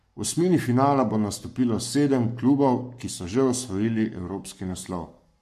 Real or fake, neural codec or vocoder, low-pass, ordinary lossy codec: fake; codec, 44.1 kHz, 7.8 kbps, Pupu-Codec; 14.4 kHz; MP3, 64 kbps